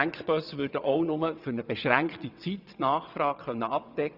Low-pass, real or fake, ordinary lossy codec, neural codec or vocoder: 5.4 kHz; fake; none; vocoder, 22.05 kHz, 80 mel bands, WaveNeXt